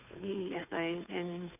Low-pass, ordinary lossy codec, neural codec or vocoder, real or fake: 3.6 kHz; none; codec, 16 kHz, 2 kbps, FunCodec, trained on Chinese and English, 25 frames a second; fake